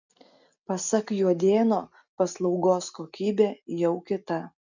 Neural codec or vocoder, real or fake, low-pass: none; real; 7.2 kHz